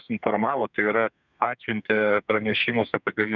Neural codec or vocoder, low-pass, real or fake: codec, 32 kHz, 1.9 kbps, SNAC; 7.2 kHz; fake